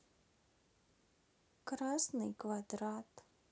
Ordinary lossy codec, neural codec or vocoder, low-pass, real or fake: none; none; none; real